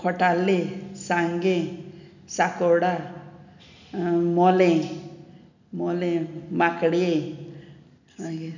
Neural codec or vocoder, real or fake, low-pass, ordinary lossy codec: none; real; 7.2 kHz; none